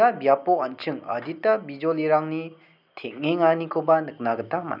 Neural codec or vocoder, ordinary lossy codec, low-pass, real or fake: none; none; 5.4 kHz; real